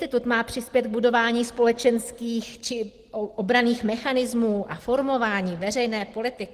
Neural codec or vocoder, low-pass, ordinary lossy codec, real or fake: none; 14.4 kHz; Opus, 16 kbps; real